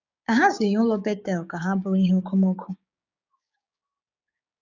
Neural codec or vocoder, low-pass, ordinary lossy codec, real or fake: codec, 16 kHz, 6 kbps, DAC; 7.2 kHz; none; fake